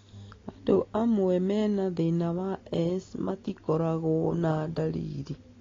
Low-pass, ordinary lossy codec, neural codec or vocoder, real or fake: 7.2 kHz; AAC, 32 kbps; none; real